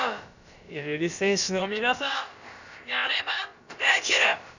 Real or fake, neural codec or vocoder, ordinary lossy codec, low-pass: fake; codec, 16 kHz, about 1 kbps, DyCAST, with the encoder's durations; none; 7.2 kHz